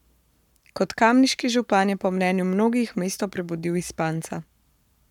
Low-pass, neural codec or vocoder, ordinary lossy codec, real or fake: 19.8 kHz; codec, 44.1 kHz, 7.8 kbps, Pupu-Codec; none; fake